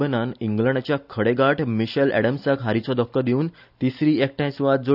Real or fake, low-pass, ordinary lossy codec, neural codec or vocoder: real; 5.4 kHz; none; none